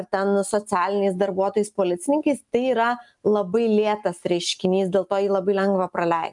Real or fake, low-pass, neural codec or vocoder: real; 10.8 kHz; none